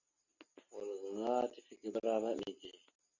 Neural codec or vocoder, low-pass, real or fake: none; 7.2 kHz; real